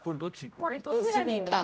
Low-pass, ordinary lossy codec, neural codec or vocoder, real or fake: none; none; codec, 16 kHz, 0.5 kbps, X-Codec, HuBERT features, trained on general audio; fake